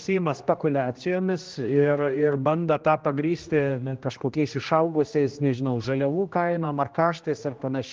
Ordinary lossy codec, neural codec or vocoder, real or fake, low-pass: Opus, 32 kbps; codec, 16 kHz, 1 kbps, X-Codec, HuBERT features, trained on general audio; fake; 7.2 kHz